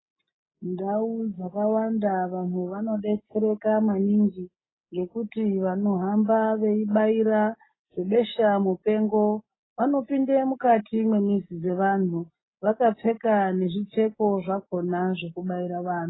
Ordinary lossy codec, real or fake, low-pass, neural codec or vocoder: AAC, 16 kbps; real; 7.2 kHz; none